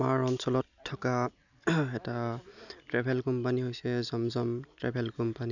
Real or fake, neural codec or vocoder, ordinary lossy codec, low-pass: real; none; none; 7.2 kHz